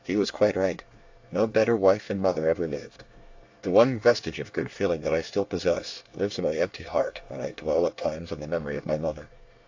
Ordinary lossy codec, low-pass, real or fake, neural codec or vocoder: AAC, 48 kbps; 7.2 kHz; fake; codec, 24 kHz, 1 kbps, SNAC